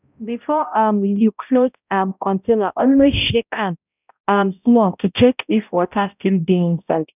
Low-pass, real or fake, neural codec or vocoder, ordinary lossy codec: 3.6 kHz; fake; codec, 16 kHz, 0.5 kbps, X-Codec, HuBERT features, trained on balanced general audio; none